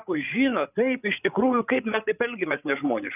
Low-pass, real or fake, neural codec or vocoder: 3.6 kHz; fake; codec, 16 kHz, 8 kbps, FreqCodec, larger model